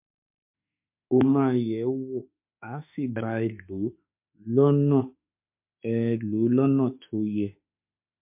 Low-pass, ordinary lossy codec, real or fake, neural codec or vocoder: 3.6 kHz; MP3, 32 kbps; fake; autoencoder, 48 kHz, 32 numbers a frame, DAC-VAE, trained on Japanese speech